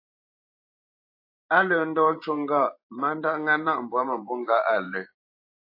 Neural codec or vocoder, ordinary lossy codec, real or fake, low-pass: vocoder, 44.1 kHz, 128 mel bands every 512 samples, BigVGAN v2; AAC, 32 kbps; fake; 5.4 kHz